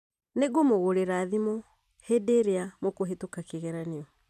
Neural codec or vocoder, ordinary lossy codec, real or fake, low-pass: vocoder, 44.1 kHz, 128 mel bands every 256 samples, BigVGAN v2; none; fake; 14.4 kHz